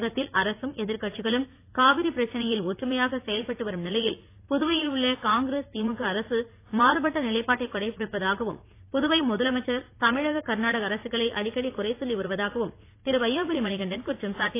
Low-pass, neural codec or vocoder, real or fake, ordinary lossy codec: 3.6 kHz; vocoder, 44.1 kHz, 80 mel bands, Vocos; fake; AAC, 24 kbps